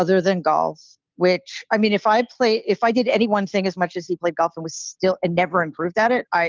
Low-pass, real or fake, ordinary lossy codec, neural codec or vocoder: 7.2 kHz; real; Opus, 24 kbps; none